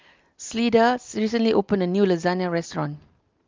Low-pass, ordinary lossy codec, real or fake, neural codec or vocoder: 7.2 kHz; Opus, 32 kbps; real; none